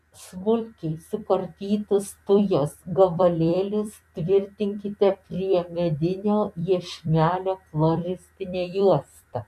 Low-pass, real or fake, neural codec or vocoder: 14.4 kHz; fake; vocoder, 44.1 kHz, 128 mel bands every 256 samples, BigVGAN v2